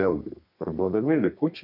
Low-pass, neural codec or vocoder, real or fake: 5.4 kHz; codec, 32 kHz, 1.9 kbps, SNAC; fake